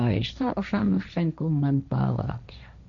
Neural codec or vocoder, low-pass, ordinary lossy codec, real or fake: codec, 16 kHz, 1.1 kbps, Voila-Tokenizer; 7.2 kHz; none; fake